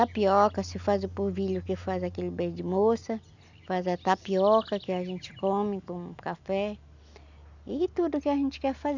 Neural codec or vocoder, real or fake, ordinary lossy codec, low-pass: none; real; none; 7.2 kHz